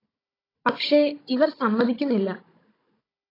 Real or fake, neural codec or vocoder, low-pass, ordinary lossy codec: fake; codec, 16 kHz, 16 kbps, FunCodec, trained on Chinese and English, 50 frames a second; 5.4 kHz; AAC, 24 kbps